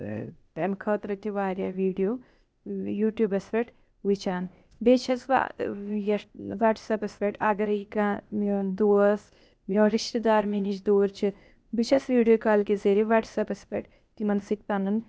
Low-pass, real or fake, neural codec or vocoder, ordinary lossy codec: none; fake; codec, 16 kHz, 0.8 kbps, ZipCodec; none